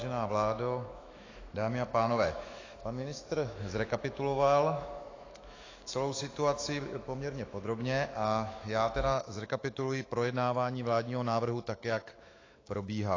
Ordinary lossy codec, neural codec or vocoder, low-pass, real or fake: AAC, 32 kbps; autoencoder, 48 kHz, 128 numbers a frame, DAC-VAE, trained on Japanese speech; 7.2 kHz; fake